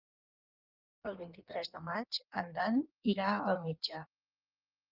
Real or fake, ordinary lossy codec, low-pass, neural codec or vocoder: fake; Opus, 32 kbps; 5.4 kHz; codec, 16 kHz in and 24 kHz out, 1.1 kbps, FireRedTTS-2 codec